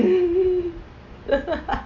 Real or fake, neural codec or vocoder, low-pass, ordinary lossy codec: real; none; 7.2 kHz; none